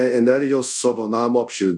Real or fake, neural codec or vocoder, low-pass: fake; codec, 24 kHz, 0.5 kbps, DualCodec; 10.8 kHz